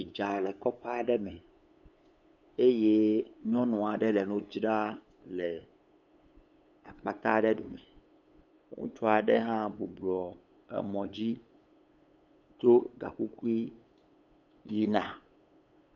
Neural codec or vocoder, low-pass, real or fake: codec, 16 kHz, 8 kbps, FunCodec, trained on LibriTTS, 25 frames a second; 7.2 kHz; fake